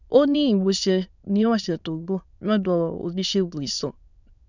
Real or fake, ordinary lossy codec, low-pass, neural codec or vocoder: fake; none; 7.2 kHz; autoencoder, 22.05 kHz, a latent of 192 numbers a frame, VITS, trained on many speakers